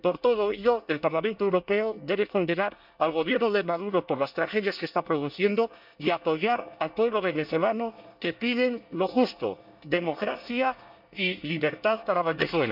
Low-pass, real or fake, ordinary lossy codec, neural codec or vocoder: 5.4 kHz; fake; none; codec, 24 kHz, 1 kbps, SNAC